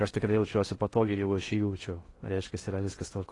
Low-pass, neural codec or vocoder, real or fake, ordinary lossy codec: 10.8 kHz; codec, 16 kHz in and 24 kHz out, 0.6 kbps, FocalCodec, streaming, 4096 codes; fake; AAC, 32 kbps